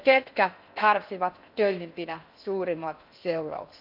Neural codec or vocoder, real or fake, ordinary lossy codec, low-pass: codec, 16 kHz in and 24 kHz out, 0.6 kbps, FocalCodec, streaming, 4096 codes; fake; none; 5.4 kHz